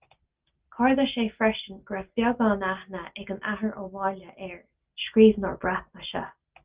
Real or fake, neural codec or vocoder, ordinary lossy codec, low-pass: real; none; Opus, 24 kbps; 3.6 kHz